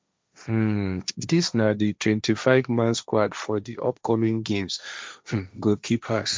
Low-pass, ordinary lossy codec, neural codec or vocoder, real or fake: none; none; codec, 16 kHz, 1.1 kbps, Voila-Tokenizer; fake